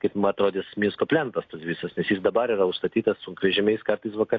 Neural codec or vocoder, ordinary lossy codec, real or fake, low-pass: none; AAC, 48 kbps; real; 7.2 kHz